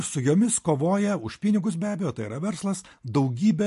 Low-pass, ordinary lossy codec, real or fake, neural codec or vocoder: 14.4 kHz; MP3, 48 kbps; real; none